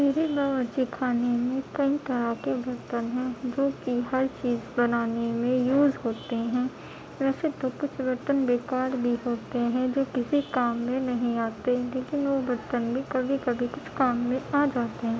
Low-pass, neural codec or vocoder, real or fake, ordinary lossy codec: none; none; real; none